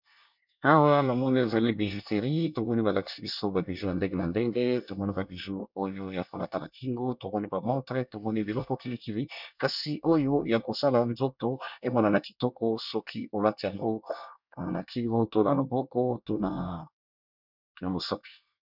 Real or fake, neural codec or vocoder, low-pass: fake; codec, 24 kHz, 1 kbps, SNAC; 5.4 kHz